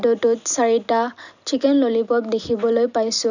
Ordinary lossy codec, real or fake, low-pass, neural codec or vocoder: none; real; 7.2 kHz; none